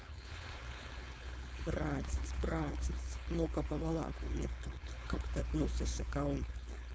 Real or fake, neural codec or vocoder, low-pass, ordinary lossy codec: fake; codec, 16 kHz, 4.8 kbps, FACodec; none; none